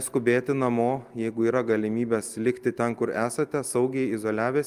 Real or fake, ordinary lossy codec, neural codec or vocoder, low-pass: real; Opus, 32 kbps; none; 14.4 kHz